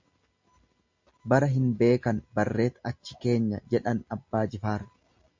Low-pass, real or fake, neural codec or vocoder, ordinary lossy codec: 7.2 kHz; real; none; MP3, 48 kbps